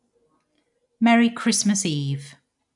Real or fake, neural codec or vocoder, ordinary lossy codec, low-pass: real; none; none; 10.8 kHz